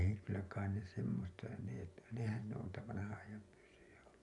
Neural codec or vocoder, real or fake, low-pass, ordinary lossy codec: vocoder, 24 kHz, 100 mel bands, Vocos; fake; 10.8 kHz; none